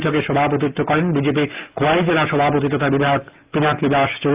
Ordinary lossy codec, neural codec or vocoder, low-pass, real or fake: Opus, 32 kbps; none; 3.6 kHz; real